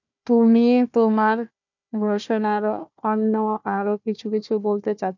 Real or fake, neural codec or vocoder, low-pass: fake; codec, 16 kHz, 1 kbps, FunCodec, trained on Chinese and English, 50 frames a second; 7.2 kHz